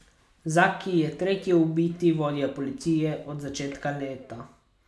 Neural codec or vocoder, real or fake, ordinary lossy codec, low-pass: none; real; none; none